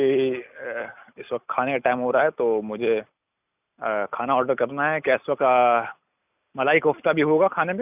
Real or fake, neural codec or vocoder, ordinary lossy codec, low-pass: real; none; none; 3.6 kHz